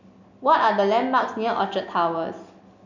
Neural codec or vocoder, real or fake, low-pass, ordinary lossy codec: none; real; 7.2 kHz; none